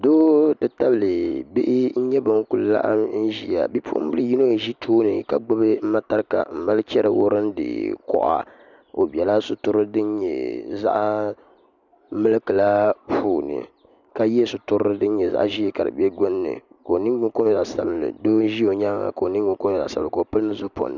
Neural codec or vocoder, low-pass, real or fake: none; 7.2 kHz; real